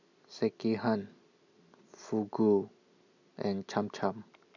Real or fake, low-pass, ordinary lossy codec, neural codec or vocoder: real; 7.2 kHz; none; none